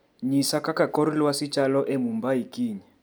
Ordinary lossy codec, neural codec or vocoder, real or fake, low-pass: none; none; real; none